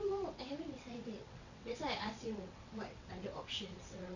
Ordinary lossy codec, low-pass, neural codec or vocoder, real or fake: none; 7.2 kHz; vocoder, 22.05 kHz, 80 mel bands, WaveNeXt; fake